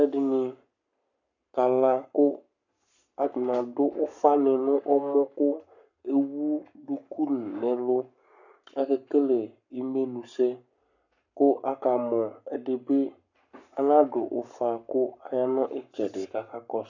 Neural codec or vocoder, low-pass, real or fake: codec, 44.1 kHz, 7.8 kbps, Pupu-Codec; 7.2 kHz; fake